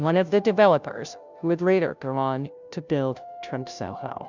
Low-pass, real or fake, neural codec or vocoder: 7.2 kHz; fake; codec, 16 kHz, 0.5 kbps, FunCodec, trained on Chinese and English, 25 frames a second